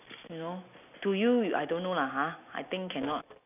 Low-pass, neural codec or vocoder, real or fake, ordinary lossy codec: 3.6 kHz; none; real; none